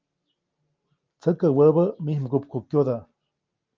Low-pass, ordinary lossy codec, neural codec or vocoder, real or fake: 7.2 kHz; Opus, 32 kbps; none; real